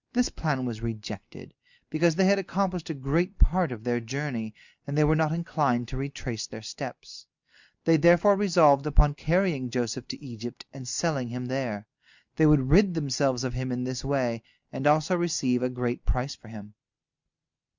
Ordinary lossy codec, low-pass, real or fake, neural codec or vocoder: Opus, 64 kbps; 7.2 kHz; real; none